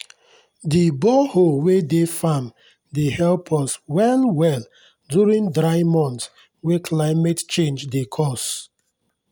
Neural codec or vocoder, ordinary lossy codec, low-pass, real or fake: none; none; none; real